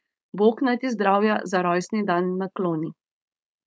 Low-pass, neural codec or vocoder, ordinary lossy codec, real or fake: none; codec, 16 kHz, 4.8 kbps, FACodec; none; fake